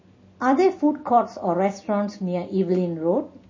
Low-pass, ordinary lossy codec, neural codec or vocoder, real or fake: 7.2 kHz; MP3, 32 kbps; none; real